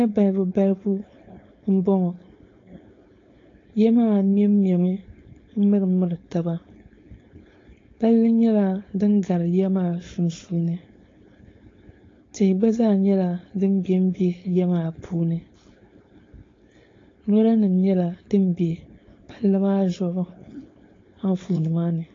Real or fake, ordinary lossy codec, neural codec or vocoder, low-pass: fake; AAC, 32 kbps; codec, 16 kHz, 4.8 kbps, FACodec; 7.2 kHz